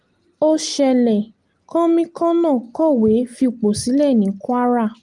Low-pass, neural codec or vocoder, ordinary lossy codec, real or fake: 10.8 kHz; none; Opus, 24 kbps; real